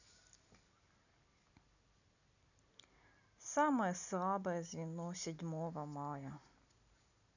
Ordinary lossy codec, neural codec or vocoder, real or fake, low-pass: none; none; real; 7.2 kHz